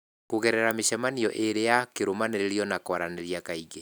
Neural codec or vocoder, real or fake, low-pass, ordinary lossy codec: none; real; none; none